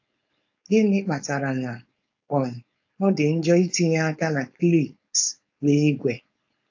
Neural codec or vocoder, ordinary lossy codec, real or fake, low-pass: codec, 16 kHz, 4.8 kbps, FACodec; AAC, 48 kbps; fake; 7.2 kHz